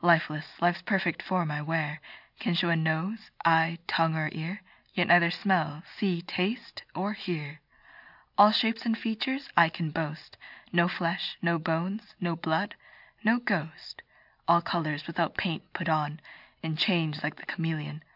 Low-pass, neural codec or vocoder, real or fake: 5.4 kHz; none; real